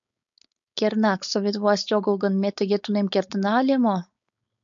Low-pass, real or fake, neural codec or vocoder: 7.2 kHz; fake; codec, 16 kHz, 4.8 kbps, FACodec